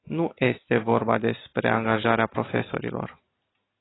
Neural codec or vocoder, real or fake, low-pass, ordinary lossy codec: none; real; 7.2 kHz; AAC, 16 kbps